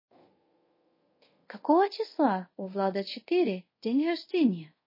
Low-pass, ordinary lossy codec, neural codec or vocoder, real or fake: 5.4 kHz; MP3, 24 kbps; codec, 24 kHz, 0.5 kbps, DualCodec; fake